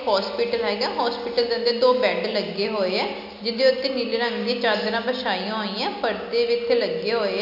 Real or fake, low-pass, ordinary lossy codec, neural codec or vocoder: real; 5.4 kHz; none; none